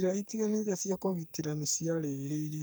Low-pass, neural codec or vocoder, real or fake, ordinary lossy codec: none; codec, 44.1 kHz, 2.6 kbps, SNAC; fake; none